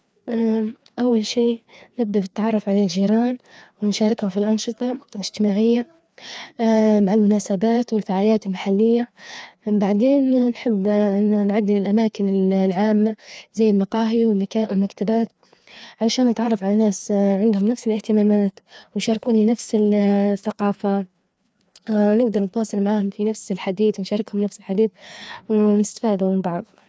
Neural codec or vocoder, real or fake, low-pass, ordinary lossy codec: codec, 16 kHz, 2 kbps, FreqCodec, larger model; fake; none; none